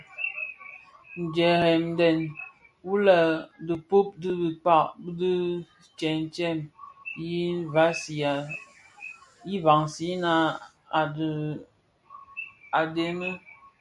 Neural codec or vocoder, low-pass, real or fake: none; 10.8 kHz; real